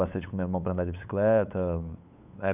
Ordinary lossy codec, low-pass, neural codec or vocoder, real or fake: none; 3.6 kHz; codec, 16 kHz, 8 kbps, FunCodec, trained on LibriTTS, 25 frames a second; fake